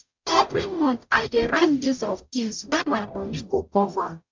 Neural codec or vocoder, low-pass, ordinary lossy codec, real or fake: codec, 44.1 kHz, 0.9 kbps, DAC; 7.2 kHz; MP3, 64 kbps; fake